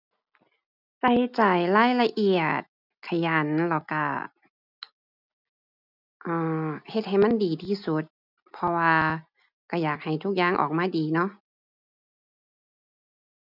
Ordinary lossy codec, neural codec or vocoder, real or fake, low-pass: none; none; real; 5.4 kHz